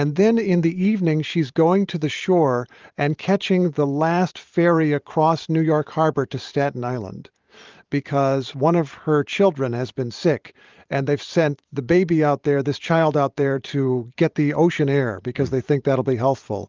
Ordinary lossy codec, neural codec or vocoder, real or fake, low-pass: Opus, 32 kbps; vocoder, 44.1 kHz, 128 mel bands every 512 samples, BigVGAN v2; fake; 7.2 kHz